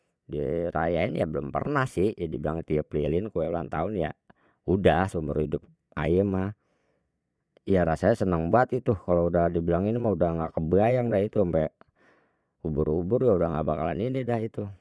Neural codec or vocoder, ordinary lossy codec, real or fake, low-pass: vocoder, 22.05 kHz, 80 mel bands, Vocos; none; fake; none